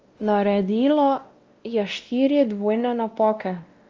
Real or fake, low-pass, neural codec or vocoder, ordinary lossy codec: fake; 7.2 kHz; codec, 16 kHz, 1 kbps, X-Codec, WavLM features, trained on Multilingual LibriSpeech; Opus, 24 kbps